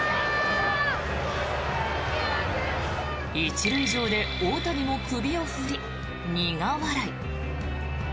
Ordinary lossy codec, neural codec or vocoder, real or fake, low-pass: none; none; real; none